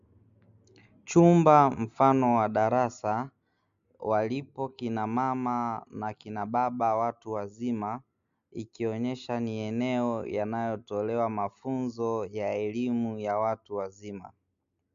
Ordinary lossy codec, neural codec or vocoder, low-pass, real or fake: MP3, 64 kbps; none; 7.2 kHz; real